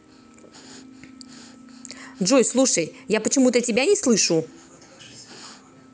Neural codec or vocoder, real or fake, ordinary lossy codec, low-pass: none; real; none; none